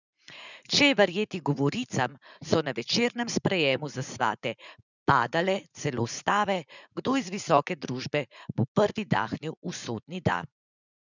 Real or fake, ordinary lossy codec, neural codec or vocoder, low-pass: fake; none; vocoder, 44.1 kHz, 80 mel bands, Vocos; 7.2 kHz